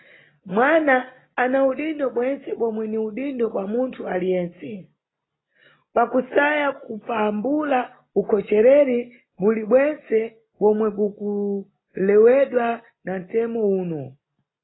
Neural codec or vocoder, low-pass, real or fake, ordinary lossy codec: none; 7.2 kHz; real; AAC, 16 kbps